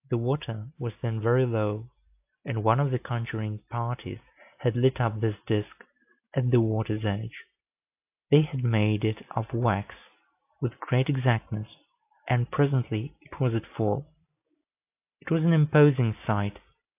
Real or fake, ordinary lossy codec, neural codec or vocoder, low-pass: real; AAC, 32 kbps; none; 3.6 kHz